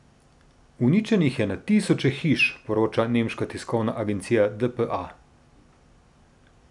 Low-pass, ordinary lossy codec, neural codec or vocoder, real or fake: 10.8 kHz; none; none; real